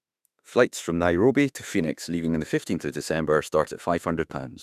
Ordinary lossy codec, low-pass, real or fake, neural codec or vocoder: none; 14.4 kHz; fake; autoencoder, 48 kHz, 32 numbers a frame, DAC-VAE, trained on Japanese speech